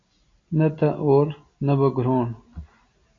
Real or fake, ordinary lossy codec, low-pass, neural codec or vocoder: real; AAC, 32 kbps; 7.2 kHz; none